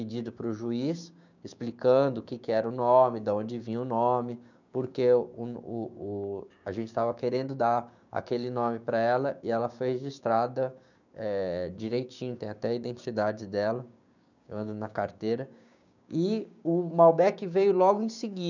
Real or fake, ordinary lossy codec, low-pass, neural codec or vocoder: fake; none; 7.2 kHz; codec, 16 kHz, 6 kbps, DAC